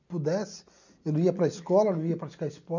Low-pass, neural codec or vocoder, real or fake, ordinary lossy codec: 7.2 kHz; none; real; none